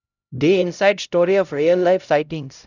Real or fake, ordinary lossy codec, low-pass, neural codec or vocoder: fake; none; 7.2 kHz; codec, 16 kHz, 0.5 kbps, X-Codec, HuBERT features, trained on LibriSpeech